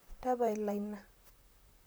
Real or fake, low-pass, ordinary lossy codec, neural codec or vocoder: fake; none; none; vocoder, 44.1 kHz, 128 mel bands, Pupu-Vocoder